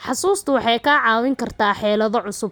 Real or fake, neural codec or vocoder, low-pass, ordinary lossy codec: real; none; none; none